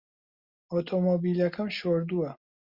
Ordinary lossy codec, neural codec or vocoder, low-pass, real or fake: Opus, 64 kbps; none; 5.4 kHz; real